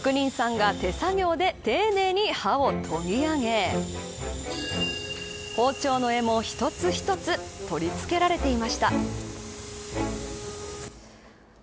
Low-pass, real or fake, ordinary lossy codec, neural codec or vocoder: none; real; none; none